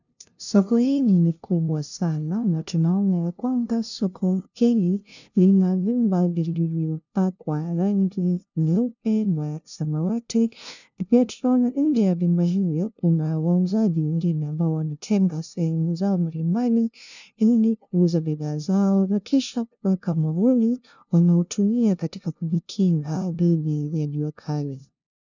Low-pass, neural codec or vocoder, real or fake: 7.2 kHz; codec, 16 kHz, 0.5 kbps, FunCodec, trained on LibriTTS, 25 frames a second; fake